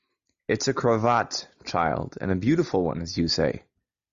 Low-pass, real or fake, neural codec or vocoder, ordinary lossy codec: 7.2 kHz; real; none; Opus, 64 kbps